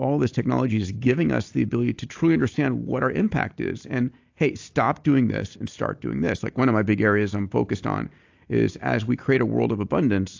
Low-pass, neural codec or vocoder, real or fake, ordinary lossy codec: 7.2 kHz; none; real; AAC, 48 kbps